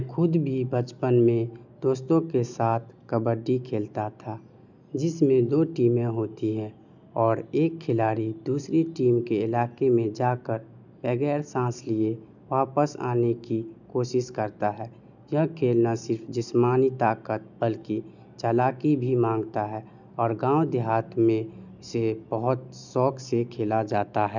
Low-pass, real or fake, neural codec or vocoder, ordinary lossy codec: 7.2 kHz; real; none; none